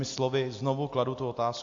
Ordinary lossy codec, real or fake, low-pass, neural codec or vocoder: AAC, 48 kbps; real; 7.2 kHz; none